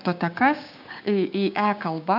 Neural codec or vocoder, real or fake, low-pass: codec, 16 kHz, 6 kbps, DAC; fake; 5.4 kHz